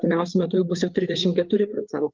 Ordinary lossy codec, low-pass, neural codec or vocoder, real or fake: Opus, 24 kbps; 7.2 kHz; codec, 16 kHz, 4 kbps, FreqCodec, larger model; fake